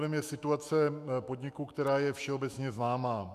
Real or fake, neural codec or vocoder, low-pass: fake; autoencoder, 48 kHz, 128 numbers a frame, DAC-VAE, trained on Japanese speech; 14.4 kHz